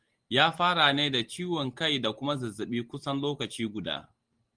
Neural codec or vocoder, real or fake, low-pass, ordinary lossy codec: none; real; 9.9 kHz; Opus, 24 kbps